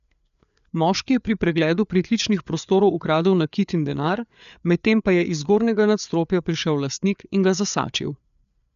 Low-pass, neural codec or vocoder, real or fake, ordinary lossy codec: 7.2 kHz; codec, 16 kHz, 4 kbps, FreqCodec, larger model; fake; none